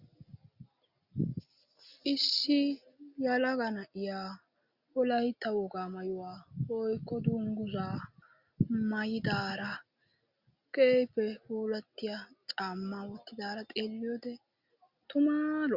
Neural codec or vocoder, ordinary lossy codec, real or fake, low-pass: none; Opus, 64 kbps; real; 5.4 kHz